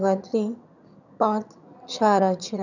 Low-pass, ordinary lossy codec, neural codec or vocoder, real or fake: 7.2 kHz; none; vocoder, 22.05 kHz, 80 mel bands, HiFi-GAN; fake